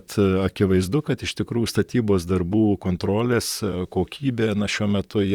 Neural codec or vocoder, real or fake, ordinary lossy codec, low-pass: vocoder, 44.1 kHz, 128 mel bands, Pupu-Vocoder; fake; Opus, 64 kbps; 19.8 kHz